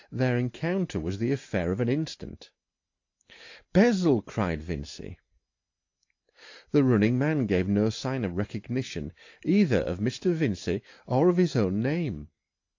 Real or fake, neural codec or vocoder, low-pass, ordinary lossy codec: real; none; 7.2 kHz; AAC, 48 kbps